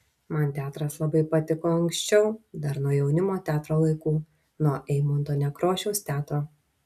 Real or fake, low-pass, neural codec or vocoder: real; 14.4 kHz; none